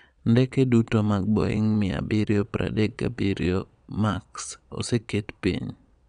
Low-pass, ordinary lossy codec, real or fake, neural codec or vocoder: 9.9 kHz; none; fake; vocoder, 22.05 kHz, 80 mel bands, Vocos